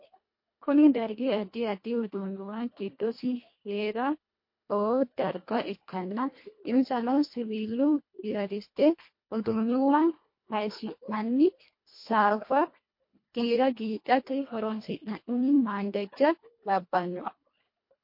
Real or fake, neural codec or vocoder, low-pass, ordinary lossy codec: fake; codec, 24 kHz, 1.5 kbps, HILCodec; 5.4 kHz; MP3, 32 kbps